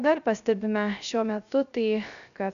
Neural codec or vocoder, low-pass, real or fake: codec, 16 kHz, 0.3 kbps, FocalCodec; 7.2 kHz; fake